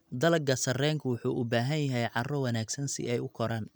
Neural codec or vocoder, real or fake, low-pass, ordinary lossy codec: none; real; none; none